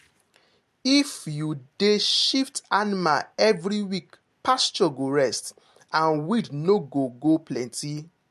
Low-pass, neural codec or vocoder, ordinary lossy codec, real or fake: 14.4 kHz; none; MP3, 64 kbps; real